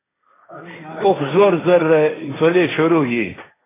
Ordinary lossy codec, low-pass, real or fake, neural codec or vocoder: AAC, 16 kbps; 3.6 kHz; fake; codec, 16 kHz, 1.1 kbps, Voila-Tokenizer